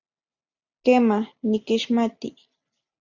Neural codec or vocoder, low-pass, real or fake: none; 7.2 kHz; real